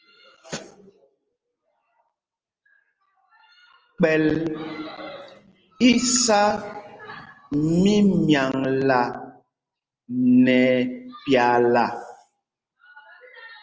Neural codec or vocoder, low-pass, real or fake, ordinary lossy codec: none; 7.2 kHz; real; Opus, 24 kbps